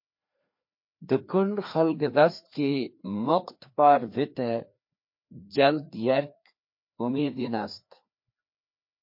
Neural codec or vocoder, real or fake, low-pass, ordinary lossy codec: codec, 16 kHz, 2 kbps, FreqCodec, larger model; fake; 5.4 kHz; MP3, 32 kbps